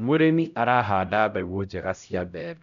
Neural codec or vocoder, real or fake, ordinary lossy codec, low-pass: codec, 16 kHz, 0.5 kbps, X-Codec, HuBERT features, trained on LibriSpeech; fake; none; 7.2 kHz